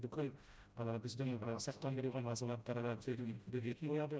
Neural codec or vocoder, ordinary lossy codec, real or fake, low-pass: codec, 16 kHz, 0.5 kbps, FreqCodec, smaller model; none; fake; none